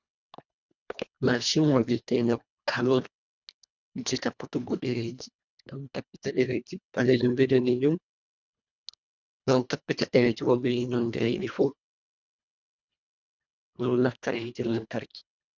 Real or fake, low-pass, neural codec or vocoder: fake; 7.2 kHz; codec, 24 kHz, 1.5 kbps, HILCodec